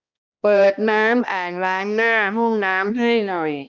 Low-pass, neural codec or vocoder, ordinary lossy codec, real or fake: 7.2 kHz; codec, 16 kHz, 1 kbps, X-Codec, HuBERT features, trained on balanced general audio; none; fake